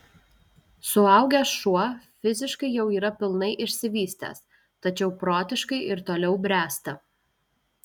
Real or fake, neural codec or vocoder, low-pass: real; none; 19.8 kHz